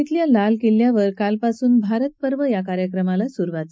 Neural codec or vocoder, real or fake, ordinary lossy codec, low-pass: none; real; none; none